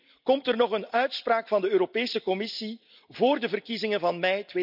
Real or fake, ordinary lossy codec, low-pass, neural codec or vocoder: real; none; 5.4 kHz; none